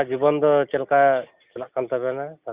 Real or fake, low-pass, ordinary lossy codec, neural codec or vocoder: real; 3.6 kHz; Opus, 64 kbps; none